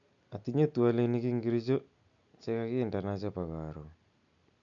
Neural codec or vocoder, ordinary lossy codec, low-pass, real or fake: none; none; 7.2 kHz; real